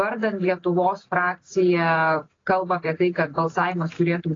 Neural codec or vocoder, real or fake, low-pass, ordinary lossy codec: none; real; 7.2 kHz; AAC, 32 kbps